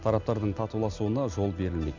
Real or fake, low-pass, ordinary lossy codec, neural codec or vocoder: real; 7.2 kHz; none; none